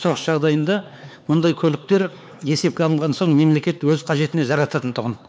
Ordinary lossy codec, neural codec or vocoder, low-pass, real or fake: none; codec, 16 kHz, 4 kbps, X-Codec, HuBERT features, trained on LibriSpeech; none; fake